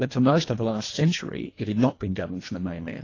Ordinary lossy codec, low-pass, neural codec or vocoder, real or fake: AAC, 32 kbps; 7.2 kHz; codec, 24 kHz, 1.5 kbps, HILCodec; fake